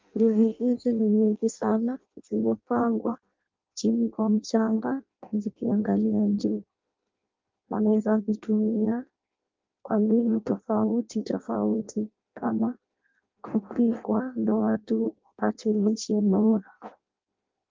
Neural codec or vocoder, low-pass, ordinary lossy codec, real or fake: codec, 16 kHz in and 24 kHz out, 0.6 kbps, FireRedTTS-2 codec; 7.2 kHz; Opus, 32 kbps; fake